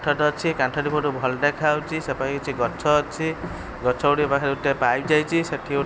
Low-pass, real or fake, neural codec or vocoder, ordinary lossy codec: none; real; none; none